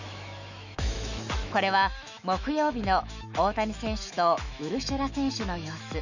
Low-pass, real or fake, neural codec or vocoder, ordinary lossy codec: 7.2 kHz; fake; autoencoder, 48 kHz, 128 numbers a frame, DAC-VAE, trained on Japanese speech; none